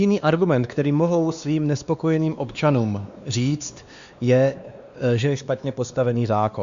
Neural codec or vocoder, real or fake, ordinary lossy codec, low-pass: codec, 16 kHz, 2 kbps, X-Codec, WavLM features, trained on Multilingual LibriSpeech; fake; Opus, 64 kbps; 7.2 kHz